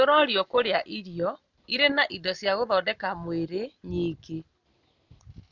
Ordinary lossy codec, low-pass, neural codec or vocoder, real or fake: none; 7.2 kHz; none; real